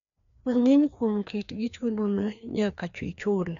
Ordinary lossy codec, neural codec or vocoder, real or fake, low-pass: none; codec, 16 kHz, 2 kbps, FreqCodec, larger model; fake; 7.2 kHz